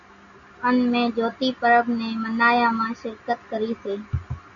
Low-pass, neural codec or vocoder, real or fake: 7.2 kHz; none; real